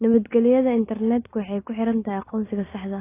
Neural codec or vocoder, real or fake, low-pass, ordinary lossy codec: none; real; 3.6 kHz; AAC, 16 kbps